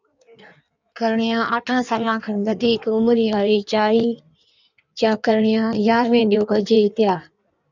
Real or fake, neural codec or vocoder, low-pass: fake; codec, 16 kHz in and 24 kHz out, 1.1 kbps, FireRedTTS-2 codec; 7.2 kHz